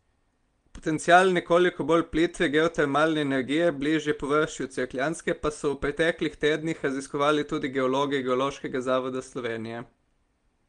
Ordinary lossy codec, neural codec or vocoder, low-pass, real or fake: Opus, 24 kbps; none; 9.9 kHz; real